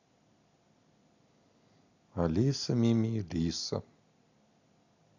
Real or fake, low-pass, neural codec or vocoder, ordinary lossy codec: real; 7.2 kHz; none; none